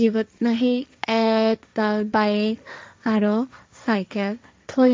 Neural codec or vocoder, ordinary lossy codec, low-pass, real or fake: codec, 16 kHz, 1.1 kbps, Voila-Tokenizer; none; none; fake